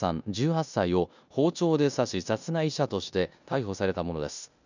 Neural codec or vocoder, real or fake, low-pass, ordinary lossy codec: codec, 16 kHz in and 24 kHz out, 0.9 kbps, LongCat-Audio-Codec, four codebook decoder; fake; 7.2 kHz; none